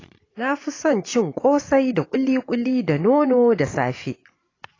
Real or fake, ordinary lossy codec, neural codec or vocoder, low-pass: fake; AAC, 32 kbps; vocoder, 24 kHz, 100 mel bands, Vocos; 7.2 kHz